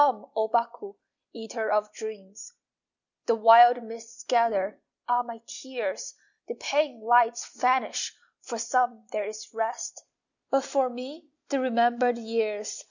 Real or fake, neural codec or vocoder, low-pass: real; none; 7.2 kHz